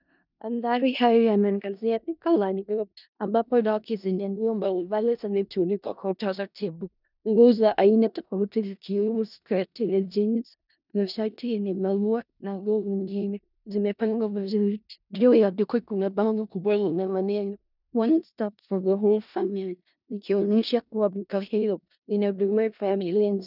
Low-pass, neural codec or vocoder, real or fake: 5.4 kHz; codec, 16 kHz in and 24 kHz out, 0.4 kbps, LongCat-Audio-Codec, four codebook decoder; fake